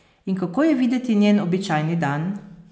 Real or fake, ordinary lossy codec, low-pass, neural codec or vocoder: real; none; none; none